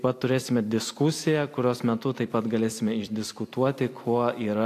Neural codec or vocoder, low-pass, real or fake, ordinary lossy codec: vocoder, 44.1 kHz, 128 mel bands every 256 samples, BigVGAN v2; 14.4 kHz; fake; AAC, 64 kbps